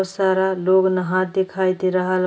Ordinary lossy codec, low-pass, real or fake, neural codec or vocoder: none; none; real; none